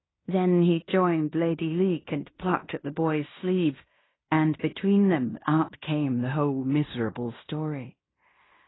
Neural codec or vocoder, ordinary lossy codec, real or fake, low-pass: codec, 16 kHz in and 24 kHz out, 0.9 kbps, LongCat-Audio-Codec, fine tuned four codebook decoder; AAC, 16 kbps; fake; 7.2 kHz